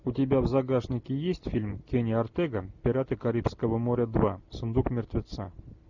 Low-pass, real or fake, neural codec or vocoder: 7.2 kHz; real; none